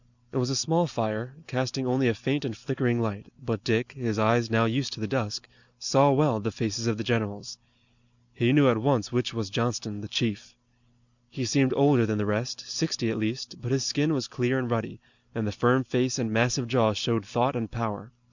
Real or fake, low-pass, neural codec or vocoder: real; 7.2 kHz; none